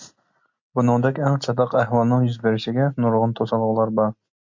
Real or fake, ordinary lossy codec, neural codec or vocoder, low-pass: real; MP3, 48 kbps; none; 7.2 kHz